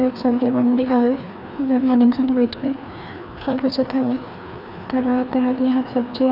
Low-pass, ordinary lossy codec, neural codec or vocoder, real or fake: 5.4 kHz; none; codec, 16 kHz, 2 kbps, FreqCodec, larger model; fake